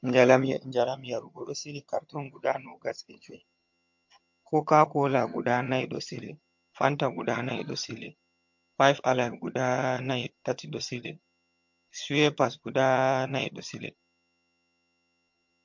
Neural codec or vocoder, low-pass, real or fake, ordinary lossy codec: vocoder, 22.05 kHz, 80 mel bands, HiFi-GAN; 7.2 kHz; fake; MP3, 48 kbps